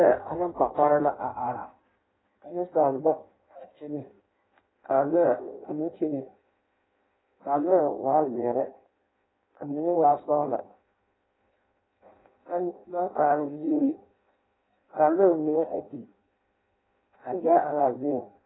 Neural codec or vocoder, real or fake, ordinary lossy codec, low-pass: codec, 16 kHz in and 24 kHz out, 0.6 kbps, FireRedTTS-2 codec; fake; AAC, 16 kbps; 7.2 kHz